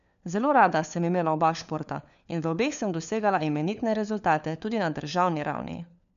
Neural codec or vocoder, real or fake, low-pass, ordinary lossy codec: codec, 16 kHz, 4 kbps, FunCodec, trained on LibriTTS, 50 frames a second; fake; 7.2 kHz; none